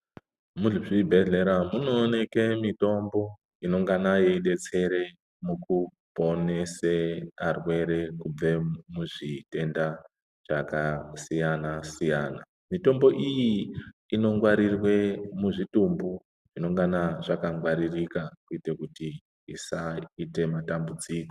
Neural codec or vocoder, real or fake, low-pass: none; real; 14.4 kHz